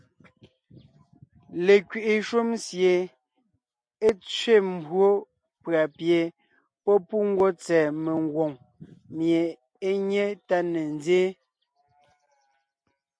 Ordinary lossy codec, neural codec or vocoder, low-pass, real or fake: MP3, 48 kbps; none; 9.9 kHz; real